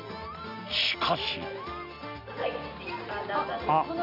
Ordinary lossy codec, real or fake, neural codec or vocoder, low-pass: none; real; none; 5.4 kHz